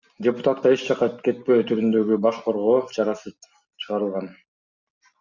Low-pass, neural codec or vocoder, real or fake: 7.2 kHz; none; real